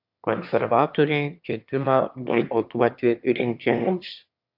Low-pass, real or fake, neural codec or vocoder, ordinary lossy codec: 5.4 kHz; fake; autoencoder, 22.05 kHz, a latent of 192 numbers a frame, VITS, trained on one speaker; AAC, 48 kbps